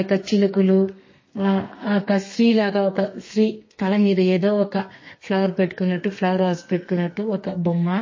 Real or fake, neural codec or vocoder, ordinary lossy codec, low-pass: fake; codec, 32 kHz, 1.9 kbps, SNAC; MP3, 32 kbps; 7.2 kHz